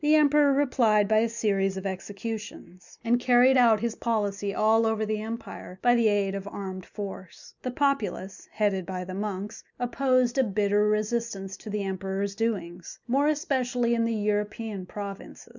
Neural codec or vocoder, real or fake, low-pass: none; real; 7.2 kHz